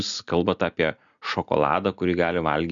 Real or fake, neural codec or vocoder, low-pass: real; none; 7.2 kHz